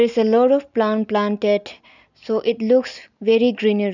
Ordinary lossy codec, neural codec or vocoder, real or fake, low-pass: none; none; real; 7.2 kHz